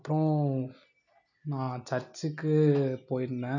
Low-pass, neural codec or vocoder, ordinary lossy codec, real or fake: 7.2 kHz; none; none; real